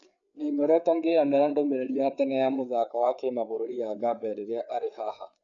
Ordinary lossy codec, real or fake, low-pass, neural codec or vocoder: none; fake; 7.2 kHz; codec, 16 kHz, 4 kbps, FreqCodec, larger model